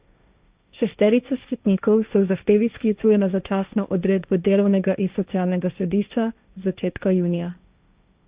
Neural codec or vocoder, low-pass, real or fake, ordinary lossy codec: codec, 16 kHz, 1.1 kbps, Voila-Tokenizer; 3.6 kHz; fake; Opus, 64 kbps